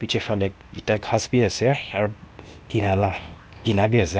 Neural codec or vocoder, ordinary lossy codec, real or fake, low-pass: codec, 16 kHz, 0.8 kbps, ZipCodec; none; fake; none